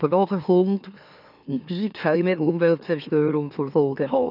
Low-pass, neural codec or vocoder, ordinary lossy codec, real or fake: 5.4 kHz; autoencoder, 44.1 kHz, a latent of 192 numbers a frame, MeloTTS; none; fake